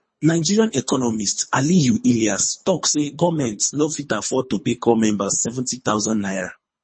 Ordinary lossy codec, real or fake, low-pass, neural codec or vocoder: MP3, 32 kbps; fake; 9.9 kHz; codec, 24 kHz, 3 kbps, HILCodec